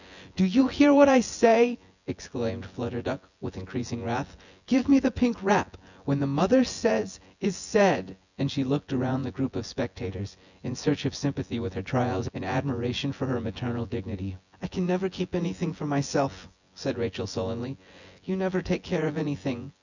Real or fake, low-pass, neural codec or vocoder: fake; 7.2 kHz; vocoder, 24 kHz, 100 mel bands, Vocos